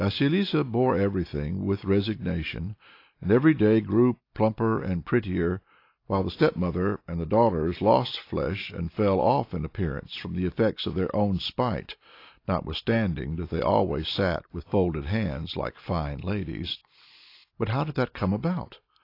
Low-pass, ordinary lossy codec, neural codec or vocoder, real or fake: 5.4 kHz; AAC, 32 kbps; none; real